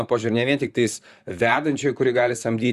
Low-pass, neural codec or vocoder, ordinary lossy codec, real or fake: 14.4 kHz; vocoder, 44.1 kHz, 128 mel bands, Pupu-Vocoder; Opus, 64 kbps; fake